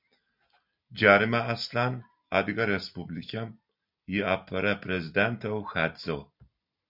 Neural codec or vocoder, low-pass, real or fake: none; 5.4 kHz; real